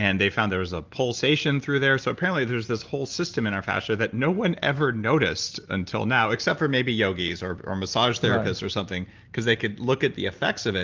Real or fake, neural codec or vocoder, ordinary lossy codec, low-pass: real; none; Opus, 24 kbps; 7.2 kHz